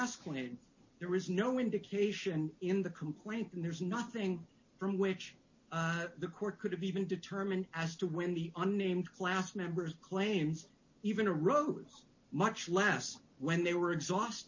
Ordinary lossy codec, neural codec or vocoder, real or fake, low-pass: MP3, 32 kbps; none; real; 7.2 kHz